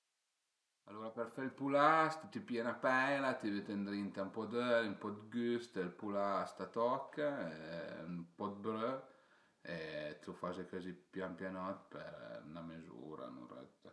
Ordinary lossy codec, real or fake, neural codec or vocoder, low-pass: none; real; none; none